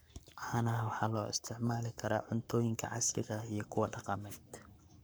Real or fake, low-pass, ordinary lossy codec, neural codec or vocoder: fake; none; none; codec, 44.1 kHz, 7.8 kbps, Pupu-Codec